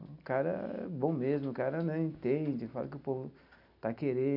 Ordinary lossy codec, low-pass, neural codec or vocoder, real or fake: none; 5.4 kHz; none; real